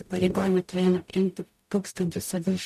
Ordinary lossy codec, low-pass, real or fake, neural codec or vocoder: MP3, 96 kbps; 14.4 kHz; fake; codec, 44.1 kHz, 0.9 kbps, DAC